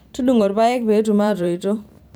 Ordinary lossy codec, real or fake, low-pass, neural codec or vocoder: none; fake; none; vocoder, 44.1 kHz, 128 mel bands every 512 samples, BigVGAN v2